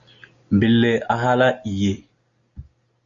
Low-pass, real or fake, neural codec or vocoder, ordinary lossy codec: 7.2 kHz; real; none; Opus, 64 kbps